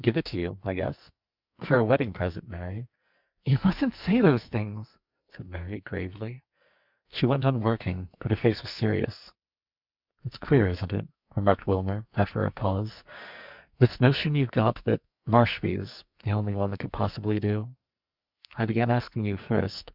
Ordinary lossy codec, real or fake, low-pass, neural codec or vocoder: Opus, 64 kbps; fake; 5.4 kHz; codec, 44.1 kHz, 2.6 kbps, SNAC